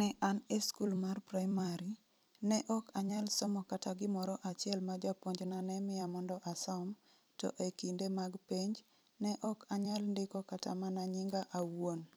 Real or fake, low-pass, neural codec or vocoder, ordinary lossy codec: fake; none; vocoder, 44.1 kHz, 128 mel bands every 256 samples, BigVGAN v2; none